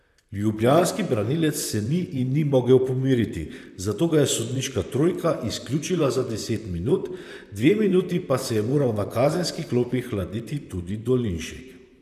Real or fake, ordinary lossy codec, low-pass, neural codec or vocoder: fake; none; 14.4 kHz; vocoder, 44.1 kHz, 128 mel bands, Pupu-Vocoder